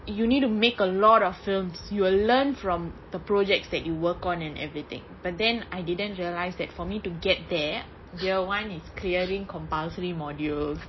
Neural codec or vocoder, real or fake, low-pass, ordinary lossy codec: none; real; 7.2 kHz; MP3, 24 kbps